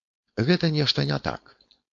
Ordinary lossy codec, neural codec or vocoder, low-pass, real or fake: AAC, 48 kbps; codec, 16 kHz, 4.8 kbps, FACodec; 7.2 kHz; fake